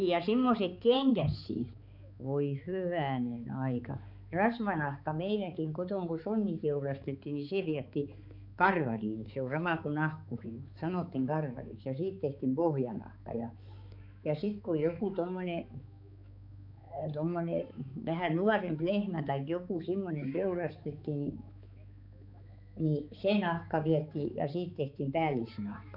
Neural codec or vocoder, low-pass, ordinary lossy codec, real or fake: codec, 16 kHz, 4 kbps, X-Codec, HuBERT features, trained on balanced general audio; 5.4 kHz; none; fake